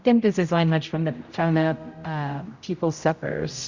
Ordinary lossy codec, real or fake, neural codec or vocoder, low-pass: Opus, 64 kbps; fake; codec, 16 kHz, 0.5 kbps, X-Codec, HuBERT features, trained on general audio; 7.2 kHz